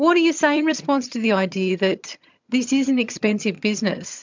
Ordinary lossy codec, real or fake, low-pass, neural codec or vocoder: MP3, 64 kbps; fake; 7.2 kHz; vocoder, 22.05 kHz, 80 mel bands, HiFi-GAN